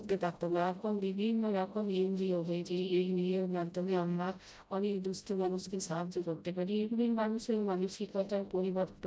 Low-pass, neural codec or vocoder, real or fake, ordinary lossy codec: none; codec, 16 kHz, 0.5 kbps, FreqCodec, smaller model; fake; none